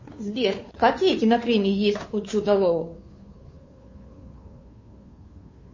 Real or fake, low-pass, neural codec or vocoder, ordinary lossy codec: fake; 7.2 kHz; codec, 16 kHz, 2 kbps, FunCodec, trained on Chinese and English, 25 frames a second; MP3, 32 kbps